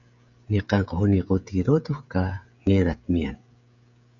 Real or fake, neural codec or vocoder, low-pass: fake; codec, 16 kHz, 16 kbps, FreqCodec, smaller model; 7.2 kHz